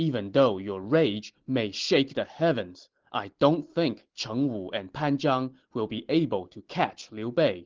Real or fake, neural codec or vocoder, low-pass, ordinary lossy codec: real; none; 7.2 kHz; Opus, 16 kbps